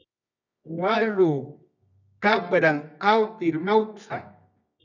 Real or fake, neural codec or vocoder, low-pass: fake; codec, 24 kHz, 0.9 kbps, WavTokenizer, medium music audio release; 7.2 kHz